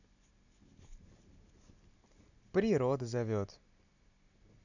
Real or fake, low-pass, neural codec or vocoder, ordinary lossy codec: real; 7.2 kHz; none; none